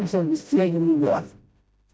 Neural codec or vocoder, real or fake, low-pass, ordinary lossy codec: codec, 16 kHz, 0.5 kbps, FreqCodec, smaller model; fake; none; none